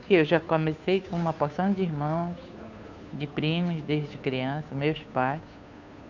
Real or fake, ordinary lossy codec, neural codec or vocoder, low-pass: fake; none; codec, 16 kHz, 2 kbps, FunCodec, trained on Chinese and English, 25 frames a second; 7.2 kHz